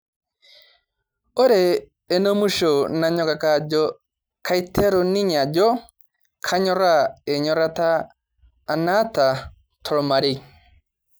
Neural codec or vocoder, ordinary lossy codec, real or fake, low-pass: none; none; real; none